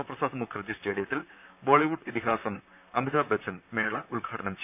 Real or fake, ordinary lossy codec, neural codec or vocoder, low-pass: fake; none; vocoder, 44.1 kHz, 128 mel bands, Pupu-Vocoder; 3.6 kHz